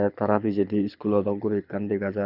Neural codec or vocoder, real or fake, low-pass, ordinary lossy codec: codec, 24 kHz, 6 kbps, HILCodec; fake; 5.4 kHz; none